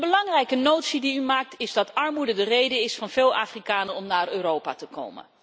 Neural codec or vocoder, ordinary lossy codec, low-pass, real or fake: none; none; none; real